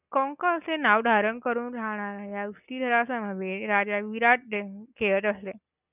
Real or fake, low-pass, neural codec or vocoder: real; 3.6 kHz; none